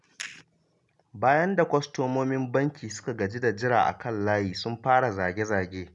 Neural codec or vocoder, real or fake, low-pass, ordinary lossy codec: none; real; 10.8 kHz; none